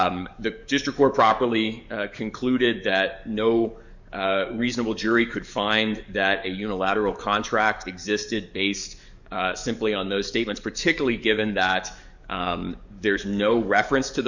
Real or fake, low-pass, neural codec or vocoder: fake; 7.2 kHz; codec, 44.1 kHz, 7.8 kbps, DAC